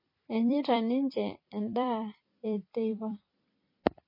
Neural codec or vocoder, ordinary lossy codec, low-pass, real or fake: vocoder, 44.1 kHz, 128 mel bands every 256 samples, BigVGAN v2; MP3, 24 kbps; 5.4 kHz; fake